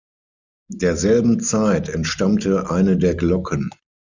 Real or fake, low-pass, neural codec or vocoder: real; 7.2 kHz; none